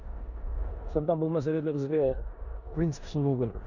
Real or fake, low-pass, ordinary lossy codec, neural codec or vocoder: fake; 7.2 kHz; none; codec, 16 kHz in and 24 kHz out, 0.9 kbps, LongCat-Audio-Codec, four codebook decoder